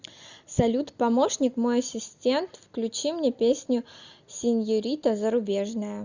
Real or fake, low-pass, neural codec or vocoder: real; 7.2 kHz; none